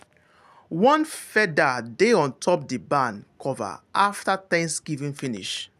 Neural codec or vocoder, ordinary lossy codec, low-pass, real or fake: none; none; 14.4 kHz; real